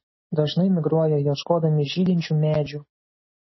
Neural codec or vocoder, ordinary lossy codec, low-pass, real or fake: none; MP3, 24 kbps; 7.2 kHz; real